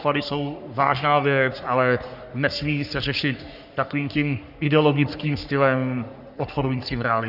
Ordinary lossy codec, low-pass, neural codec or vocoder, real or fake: Opus, 64 kbps; 5.4 kHz; codec, 44.1 kHz, 3.4 kbps, Pupu-Codec; fake